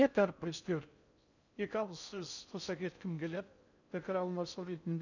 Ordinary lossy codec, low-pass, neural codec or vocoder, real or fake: none; 7.2 kHz; codec, 16 kHz in and 24 kHz out, 0.6 kbps, FocalCodec, streaming, 4096 codes; fake